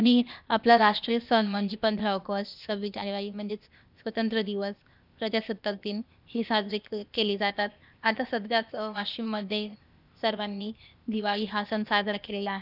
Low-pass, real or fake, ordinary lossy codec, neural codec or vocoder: 5.4 kHz; fake; none; codec, 16 kHz, 0.8 kbps, ZipCodec